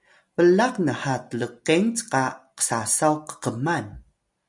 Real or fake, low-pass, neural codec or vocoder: real; 10.8 kHz; none